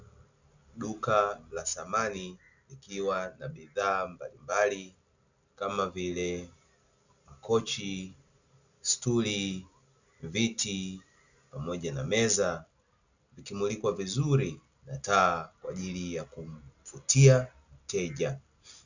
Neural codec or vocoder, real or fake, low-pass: none; real; 7.2 kHz